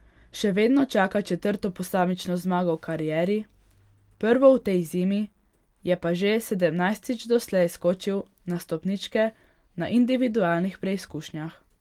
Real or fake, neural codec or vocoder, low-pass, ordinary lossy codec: real; none; 19.8 kHz; Opus, 24 kbps